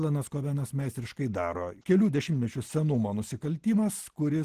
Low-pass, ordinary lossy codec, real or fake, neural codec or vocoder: 14.4 kHz; Opus, 16 kbps; real; none